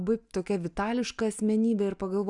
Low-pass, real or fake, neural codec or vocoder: 10.8 kHz; real; none